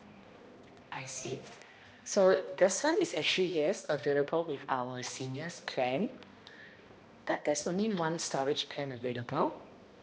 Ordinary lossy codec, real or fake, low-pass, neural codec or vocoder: none; fake; none; codec, 16 kHz, 1 kbps, X-Codec, HuBERT features, trained on balanced general audio